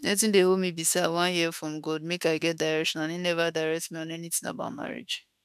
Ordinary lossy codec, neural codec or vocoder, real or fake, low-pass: none; autoencoder, 48 kHz, 32 numbers a frame, DAC-VAE, trained on Japanese speech; fake; 14.4 kHz